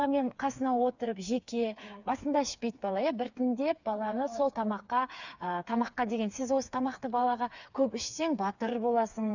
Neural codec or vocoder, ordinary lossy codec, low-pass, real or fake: codec, 16 kHz, 4 kbps, FreqCodec, smaller model; none; 7.2 kHz; fake